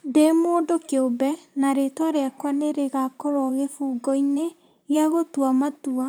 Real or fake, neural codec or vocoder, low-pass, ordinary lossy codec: fake; vocoder, 44.1 kHz, 128 mel bands, Pupu-Vocoder; none; none